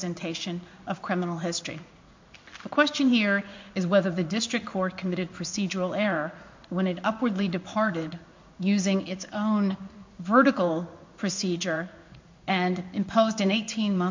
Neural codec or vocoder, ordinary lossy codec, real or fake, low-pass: codec, 16 kHz in and 24 kHz out, 1 kbps, XY-Tokenizer; MP3, 48 kbps; fake; 7.2 kHz